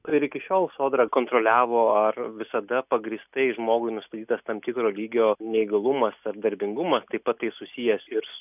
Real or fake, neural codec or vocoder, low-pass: real; none; 3.6 kHz